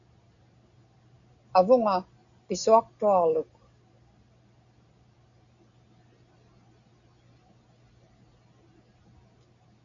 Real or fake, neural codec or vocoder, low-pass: real; none; 7.2 kHz